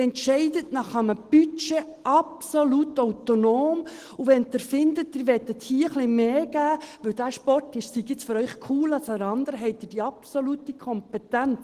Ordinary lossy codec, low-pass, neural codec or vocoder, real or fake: Opus, 16 kbps; 14.4 kHz; none; real